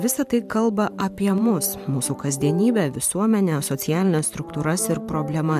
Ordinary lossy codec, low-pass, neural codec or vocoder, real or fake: MP3, 96 kbps; 14.4 kHz; vocoder, 44.1 kHz, 128 mel bands every 512 samples, BigVGAN v2; fake